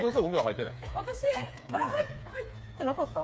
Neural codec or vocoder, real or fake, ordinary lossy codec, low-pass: codec, 16 kHz, 4 kbps, FreqCodec, smaller model; fake; none; none